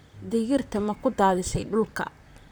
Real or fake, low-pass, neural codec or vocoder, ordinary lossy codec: fake; none; vocoder, 44.1 kHz, 128 mel bands, Pupu-Vocoder; none